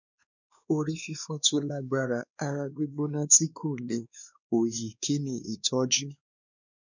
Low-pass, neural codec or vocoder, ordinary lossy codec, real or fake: 7.2 kHz; codec, 16 kHz, 2 kbps, X-Codec, WavLM features, trained on Multilingual LibriSpeech; none; fake